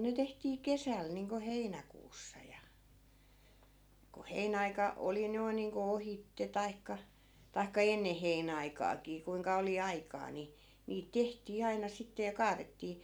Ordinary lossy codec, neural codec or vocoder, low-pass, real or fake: none; none; none; real